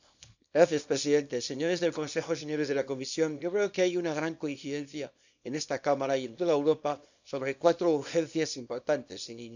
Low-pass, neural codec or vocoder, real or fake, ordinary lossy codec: 7.2 kHz; codec, 24 kHz, 0.9 kbps, WavTokenizer, small release; fake; none